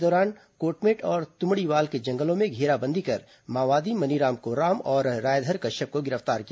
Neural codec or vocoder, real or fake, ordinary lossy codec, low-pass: none; real; none; none